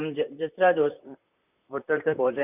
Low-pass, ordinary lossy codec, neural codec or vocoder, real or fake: 3.6 kHz; none; vocoder, 44.1 kHz, 128 mel bands, Pupu-Vocoder; fake